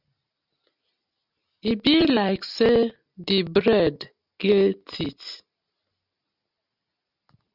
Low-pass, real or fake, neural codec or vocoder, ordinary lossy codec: 5.4 kHz; real; none; Opus, 64 kbps